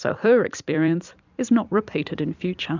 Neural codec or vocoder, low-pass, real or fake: vocoder, 44.1 kHz, 128 mel bands every 256 samples, BigVGAN v2; 7.2 kHz; fake